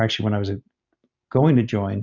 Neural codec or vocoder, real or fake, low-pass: none; real; 7.2 kHz